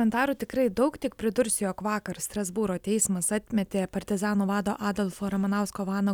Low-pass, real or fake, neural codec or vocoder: 19.8 kHz; real; none